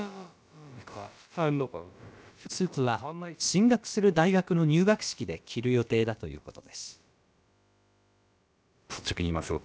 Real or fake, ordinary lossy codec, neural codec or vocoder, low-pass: fake; none; codec, 16 kHz, about 1 kbps, DyCAST, with the encoder's durations; none